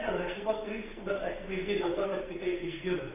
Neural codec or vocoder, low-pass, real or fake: codec, 44.1 kHz, 2.6 kbps, SNAC; 3.6 kHz; fake